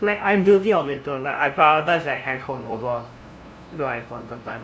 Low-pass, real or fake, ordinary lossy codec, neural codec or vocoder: none; fake; none; codec, 16 kHz, 0.5 kbps, FunCodec, trained on LibriTTS, 25 frames a second